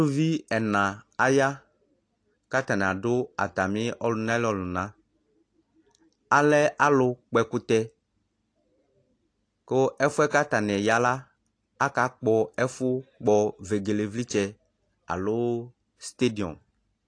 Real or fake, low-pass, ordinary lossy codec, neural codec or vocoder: real; 9.9 kHz; AAC, 48 kbps; none